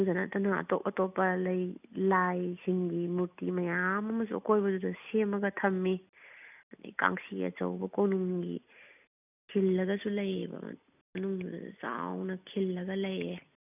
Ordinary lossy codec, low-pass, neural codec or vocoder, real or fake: none; 3.6 kHz; none; real